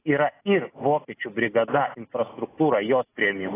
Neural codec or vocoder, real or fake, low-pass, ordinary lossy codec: none; real; 3.6 kHz; AAC, 16 kbps